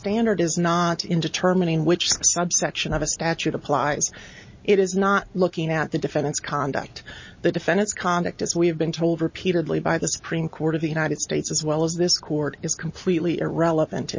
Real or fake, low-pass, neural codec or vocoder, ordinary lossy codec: real; 7.2 kHz; none; MP3, 32 kbps